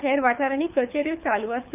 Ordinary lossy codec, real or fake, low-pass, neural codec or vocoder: none; fake; 3.6 kHz; codec, 24 kHz, 6 kbps, HILCodec